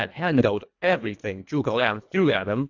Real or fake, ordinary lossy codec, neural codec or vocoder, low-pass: fake; AAC, 48 kbps; codec, 24 kHz, 1.5 kbps, HILCodec; 7.2 kHz